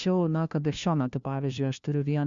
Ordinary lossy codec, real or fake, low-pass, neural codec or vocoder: Opus, 64 kbps; fake; 7.2 kHz; codec, 16 kHz, 1 kbps, FunCodec, trained on LibriTTS, 50 frames a second